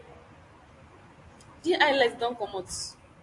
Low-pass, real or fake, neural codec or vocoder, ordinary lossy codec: 10.8 kHz; real; none; AAC, 48 kbps